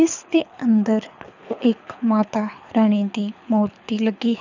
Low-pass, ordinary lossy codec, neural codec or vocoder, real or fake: 7.2 kHz; none; codec, 24 kHz, 6 kbps, HILCodec; fake